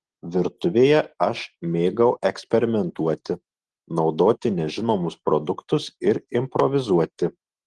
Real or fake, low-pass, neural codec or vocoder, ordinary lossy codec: real; 10.8 kHz; none; Opus, 16 kbps